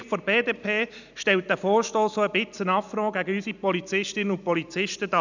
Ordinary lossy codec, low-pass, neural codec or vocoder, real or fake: none; 7.2 kHz; none; real